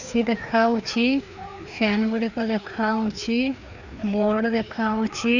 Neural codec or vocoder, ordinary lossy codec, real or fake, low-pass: codec, 16 kHz, 2 kbps, FreqCodec, larger model; none; fake; 7.2 kHz